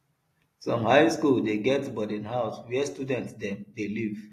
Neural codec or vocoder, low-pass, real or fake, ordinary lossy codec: none; 14.4 kHz; real; AAC, 48 kbps